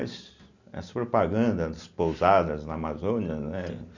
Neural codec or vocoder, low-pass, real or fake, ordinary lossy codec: none; 7.2 kHz; real; none